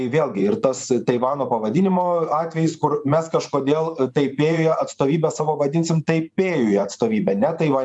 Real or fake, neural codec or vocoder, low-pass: fake; vocoder, 24 kHz, 100 mel bands, Vocos; 10.8 kHz